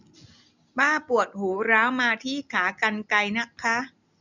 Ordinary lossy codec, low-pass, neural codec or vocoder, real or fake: none; 7.2 kHz; none; real